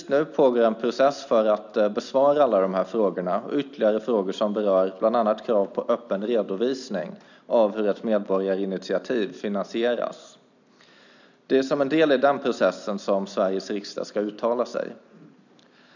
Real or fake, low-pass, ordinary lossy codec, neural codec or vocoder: real; 7.2 kHz; none; none